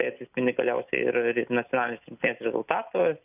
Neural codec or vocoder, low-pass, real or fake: none; 3.6 kHz; real